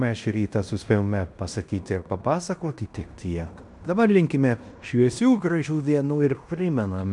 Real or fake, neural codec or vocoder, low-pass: fake; codec, 16 kHz in and 24 kHz out, 0.9 kbps, LongCat-Audio-Codec, fine tuned four codebook decoder; 10.8 kHz